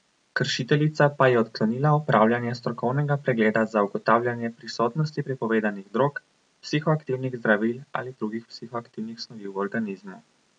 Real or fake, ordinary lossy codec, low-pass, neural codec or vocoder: real; none; 9.9 kHz; none